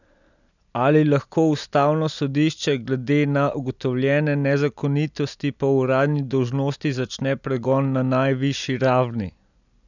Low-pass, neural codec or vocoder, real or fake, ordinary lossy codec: 7.2 kHz; none; real; none